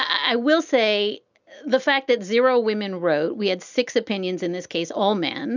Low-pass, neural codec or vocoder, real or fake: 7.2 kHz; none; real